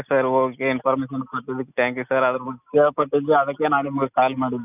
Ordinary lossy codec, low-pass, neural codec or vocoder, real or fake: none; 3.6 kHz; none; real